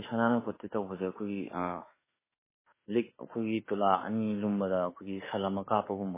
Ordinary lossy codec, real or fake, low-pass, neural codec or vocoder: MP3, 16 kbps; fake; 3.6 kHz; autoencoder, 48 kHz, 32 numbers a frame, DAC-VAE, trained on Japanese speech